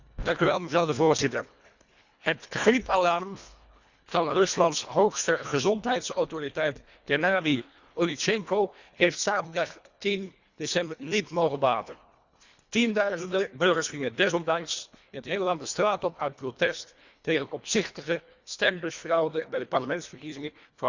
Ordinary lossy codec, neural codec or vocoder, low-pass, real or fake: Opus, 64 kbps; codec, 24 kHz, 1.5 kbps, HILCodec; 7.2 kHz; fake